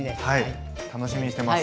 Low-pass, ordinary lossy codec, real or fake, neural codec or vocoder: none; none; real; none